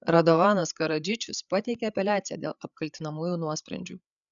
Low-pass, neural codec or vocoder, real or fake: 7.2 kHz; codec, 16 kHz, 8 kbps, FreqCodec, larger model; fake